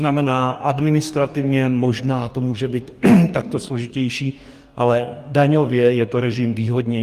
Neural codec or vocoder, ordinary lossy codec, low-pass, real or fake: codec, 44.1 kHz, 2.6 kbps, DAC; Opus, 32 kbps; 14.4 kHz; fake